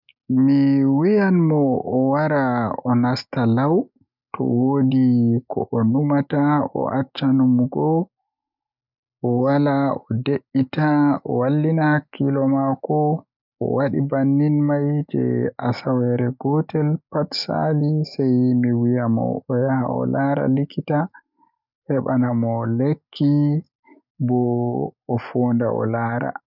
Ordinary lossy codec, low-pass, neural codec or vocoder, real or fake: none; 5.4 kHz; none; real